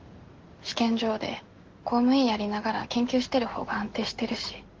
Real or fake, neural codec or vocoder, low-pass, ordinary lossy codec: real; none; 7.2 kHz; Opus, 16 kbps